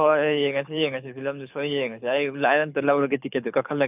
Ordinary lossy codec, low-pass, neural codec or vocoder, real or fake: none; 3.6 kHz; codec, 24 kHz, 6 kbps, HILCodec; fake